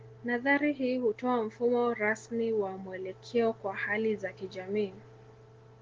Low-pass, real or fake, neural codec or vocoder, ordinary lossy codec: 7.2 kHz; real; none; Opus, 16 kbps